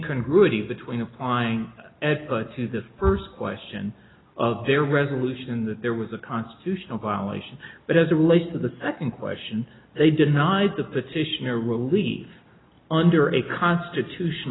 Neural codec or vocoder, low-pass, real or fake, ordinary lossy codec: none; 7.2 kHz; real; AAC, 16 kbps